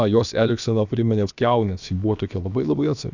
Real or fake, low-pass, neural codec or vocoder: fake; 7.2 kHz; codec, 16 kHz, about 1 kbps, DyCAST, with the encoder's durations